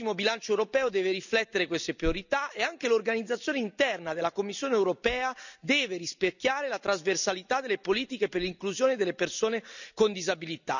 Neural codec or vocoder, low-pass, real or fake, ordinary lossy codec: none; 7.2 kHz; real; none